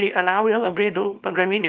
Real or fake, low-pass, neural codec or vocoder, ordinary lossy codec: fake; 7.2 kHz; codec, 24 kHz, 0.9 kbps, WavTokenizer, small release; Opus, 24 kbps